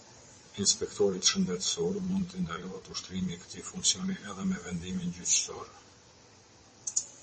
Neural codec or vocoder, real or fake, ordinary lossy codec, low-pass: vocoder, 22.05 kHz, 80 mel bands, WaveNeXt; fake; MP3, 32 kbps; 9.9 kHz